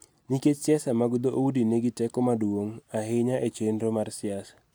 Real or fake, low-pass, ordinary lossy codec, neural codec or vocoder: real; none; none; none